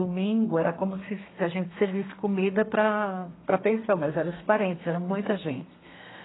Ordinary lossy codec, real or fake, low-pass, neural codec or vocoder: AAC, 16 kbps; fake; 7.2 kHz; codec, 32 kHz, 1.9 kbps, SNAC